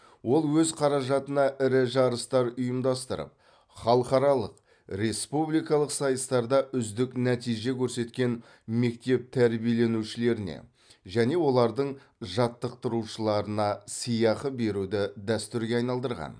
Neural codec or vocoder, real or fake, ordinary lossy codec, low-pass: none; real; none; 9.9 kHz